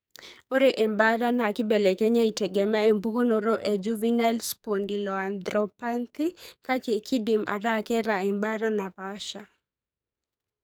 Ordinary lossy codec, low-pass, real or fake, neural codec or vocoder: none; none; fake; codec, 44.1 kHz, 2.6 kbps, SNAC